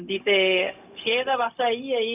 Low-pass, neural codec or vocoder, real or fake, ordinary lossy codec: 3.6 kHz; codec, 16 kHz, 0.4 kbps, LongCat-Audio-Codec; fake; none